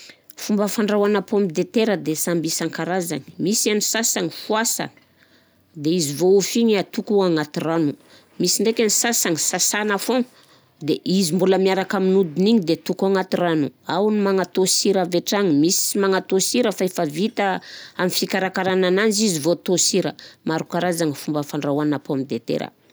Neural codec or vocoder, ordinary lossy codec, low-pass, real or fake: none; none; none; real